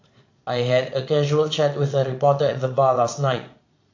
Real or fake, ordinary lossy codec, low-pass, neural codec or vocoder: fake; AAC, 48 kbps; 7.2 kHz; vocoder, 22.05 kHz, 80 mel bands, Vocos